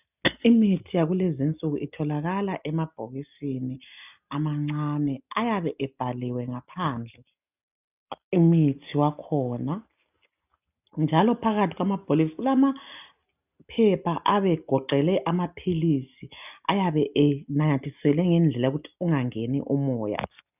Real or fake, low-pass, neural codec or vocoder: real; 3.6 kHz; none